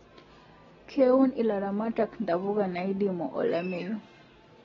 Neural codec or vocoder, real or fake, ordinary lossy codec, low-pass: none; real; AAC, 24 kbps; 7.2 kHz